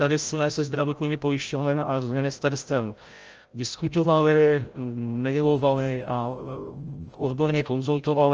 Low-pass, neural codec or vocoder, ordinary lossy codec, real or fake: 7.2 kHz; codec, 16 kHz, 0.5 kbps, FreqCodec, larger model; Opus, 24 kbps; fake